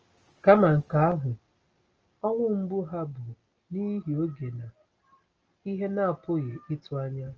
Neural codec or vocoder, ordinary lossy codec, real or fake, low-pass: none; Opus, 24 kbps; real; 7.2 kHz